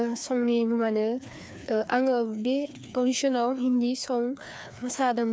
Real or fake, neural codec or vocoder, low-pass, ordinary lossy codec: fake; codec, 16 kHz, 2 kbps, FreqCodec, larger model; none; none